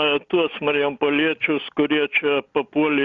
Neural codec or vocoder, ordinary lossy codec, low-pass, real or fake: none; Opus, 64 kbps; 7.2 kHz; real